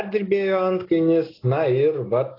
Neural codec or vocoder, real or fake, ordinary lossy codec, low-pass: none; real; AAC, 24 kbps; 5.4 kHz